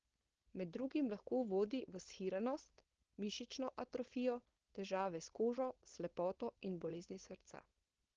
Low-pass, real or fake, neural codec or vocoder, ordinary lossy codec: 7.2 kHz; fake; codec, 16 kHz, 4.8 kbps, FACodec; Opus, 16 kbps